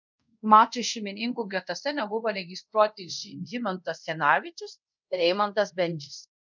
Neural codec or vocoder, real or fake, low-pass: codec, 24 kHz, 0.5 kbps, DualCodec; fake; 7.2 kHz